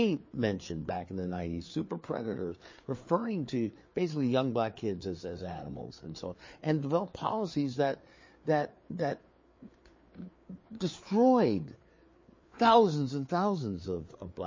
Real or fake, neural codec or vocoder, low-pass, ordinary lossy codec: fake; codec, 16 kHz, 4 kbps, FreqCodec, larger model; 7.2 kHz; MP3, 32 kbps